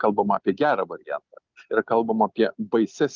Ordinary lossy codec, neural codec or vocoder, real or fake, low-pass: Opus, 24 kbps; none; real; 7.2 kHz